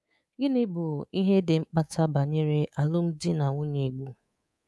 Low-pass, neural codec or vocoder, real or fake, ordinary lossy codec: none; codec, 24 kHz, 3.1 kbps, DualCodec; fake; none